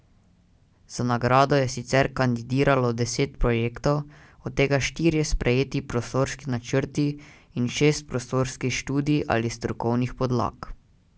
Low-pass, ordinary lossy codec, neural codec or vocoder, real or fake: none; none; none; real